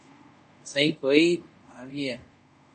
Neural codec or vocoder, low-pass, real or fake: codec, 24 kHz, 0.5 kbps, DualCodec; 10.8 kHz; fake